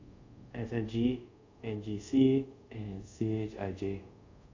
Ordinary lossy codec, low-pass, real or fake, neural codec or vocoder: MP3, 48 kbps; 7.2 kHz; fake; codec, 24 kHz, 0.5 kbps, DualCodec